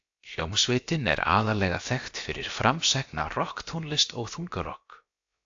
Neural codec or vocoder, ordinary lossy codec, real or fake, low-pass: codec, 16 kHz, about 1 kbps, DyCAST, with the encoder's durations; AAC, 48 kbps; fake; 7.2 kHz